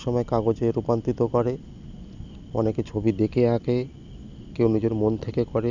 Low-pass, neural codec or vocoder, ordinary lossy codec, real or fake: 7.2 kHz; none; none; real